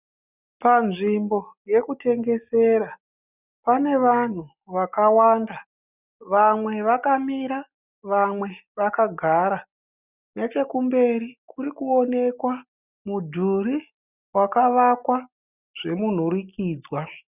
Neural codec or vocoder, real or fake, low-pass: none; real; 3.6 kHz